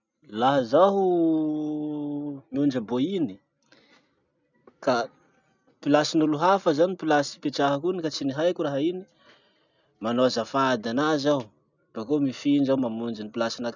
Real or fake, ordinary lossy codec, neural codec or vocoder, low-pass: real; none; none; 7.2 kHz